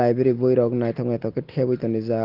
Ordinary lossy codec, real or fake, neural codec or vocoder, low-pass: Opus, 16 kbps; real; none; 5.4 kHz